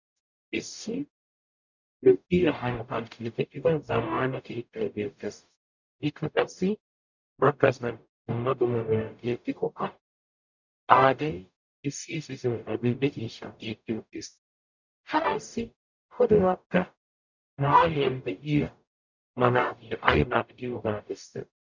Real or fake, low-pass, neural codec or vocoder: fake; 7.2 kHz; codec, 44.1 kHz, 0.9 kbps, DAC